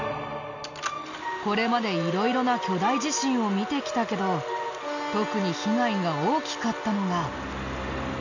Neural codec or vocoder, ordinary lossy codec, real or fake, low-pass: none; none; real; 7.2 kHz